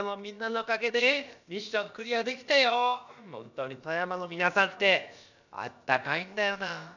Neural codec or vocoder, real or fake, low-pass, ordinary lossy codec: codec, 16 kHz, about 1 kbps, DyCAST, with the encoder's durations; fake; 7.2 kHz; none